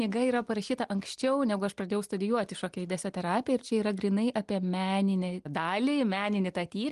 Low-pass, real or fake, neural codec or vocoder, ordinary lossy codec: 10.8 kHz; real; none; Opus, 24 kbps